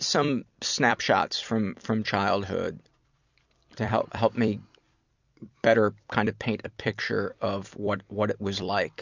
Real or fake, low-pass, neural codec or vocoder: fake; 7.2 kHz; vocoder, 44.1 kHz, 128 mel bands every 256 samples, BigVGAN v2